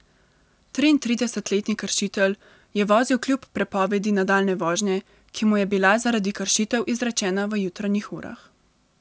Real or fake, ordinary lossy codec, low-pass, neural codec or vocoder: real; none; none; none